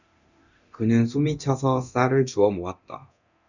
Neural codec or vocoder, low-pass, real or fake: codec, 24 kHz, 0.9 kbps, DualCodec; 7.2 kHz; fake